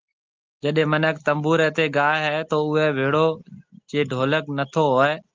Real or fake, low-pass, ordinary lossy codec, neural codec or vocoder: real; 7.2 kHz; Opus, 32 kbps; none